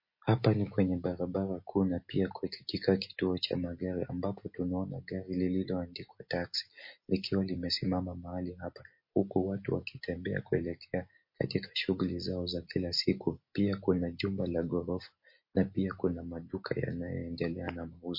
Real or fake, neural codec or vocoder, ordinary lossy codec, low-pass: real; none; MP3, 32 kbps; 5.4 kHz